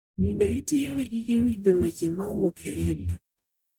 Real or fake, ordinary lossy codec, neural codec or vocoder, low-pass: fake; none; codec, 44.1 kHz, 0.9 kbps, DAC; 19.8 kHz